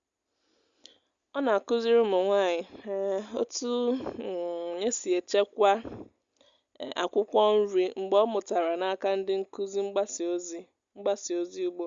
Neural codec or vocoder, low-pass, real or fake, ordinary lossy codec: none; 7.2 kHz; real; Opus, 64 kbps